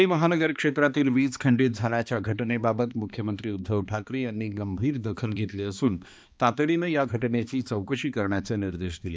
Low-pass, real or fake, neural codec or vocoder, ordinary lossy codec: none; fake; codec, 16 kHz, 2 kbps, X-Codec, HuBERT features, trained on balanced general audio; none